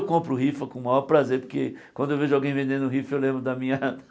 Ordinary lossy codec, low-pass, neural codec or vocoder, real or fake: none; none; none; real